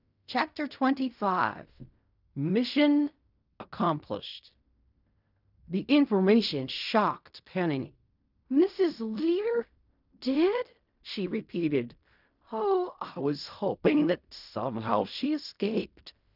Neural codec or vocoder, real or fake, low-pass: codec, 16 kHz in and 24 kHz out, 0.4 kbps, LongCat-Audio-Codec, fine tuned four codebook decoder; fake; 5.4 kHz